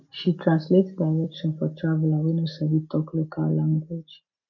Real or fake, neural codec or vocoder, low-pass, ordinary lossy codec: real; none; 7.2 kHz; none